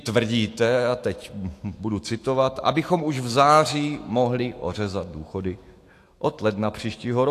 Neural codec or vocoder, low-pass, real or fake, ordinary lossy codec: autoencoder, 48 kHz, 128 numbers a frame, DAC-VAE, trained on Japanese speech; 14.4 kHz; fake; AAC, 64 kbps